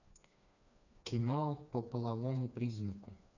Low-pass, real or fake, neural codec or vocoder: 7.2 kHz; fake; codec, 16 kHz, 2 kbps, FreqCodec, smaller model